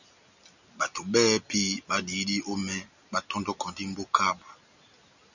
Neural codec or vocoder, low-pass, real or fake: none; 7.2 kHz; real